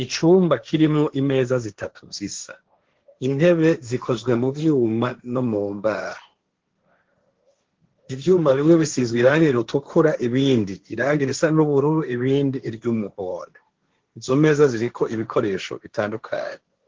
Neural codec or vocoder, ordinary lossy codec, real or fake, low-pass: codec, 16 kHz, 1.1 kbps, Voila-Tokenizer; Opus, 16 kbps; fake; 7.2 kHz